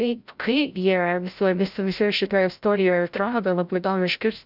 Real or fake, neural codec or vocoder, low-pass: fake; codec, 16 kHz, 0.5 kbps, FreqCodec, larger model; 5.4 kHz